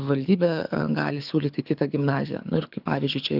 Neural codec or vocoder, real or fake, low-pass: codec, 24 kHz, 6 kbps, HILCodec; fake; 5.4 kHz